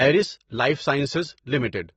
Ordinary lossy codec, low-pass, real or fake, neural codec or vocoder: AAC, 24 kbps; 19.8 kHz; real; none